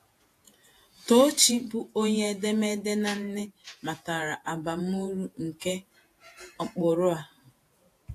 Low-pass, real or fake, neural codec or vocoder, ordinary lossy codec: 14.4 kHz; fake; vocoder, 48 kHz, 128 mel bands, Vocos; AAC, 64 kbps